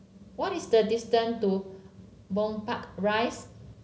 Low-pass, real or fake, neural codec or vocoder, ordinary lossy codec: none; real; none; none